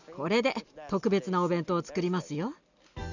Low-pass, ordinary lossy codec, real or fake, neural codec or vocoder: 7.2 kHz; none; real; none